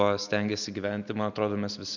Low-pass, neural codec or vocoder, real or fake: 7.2 kHz; none; real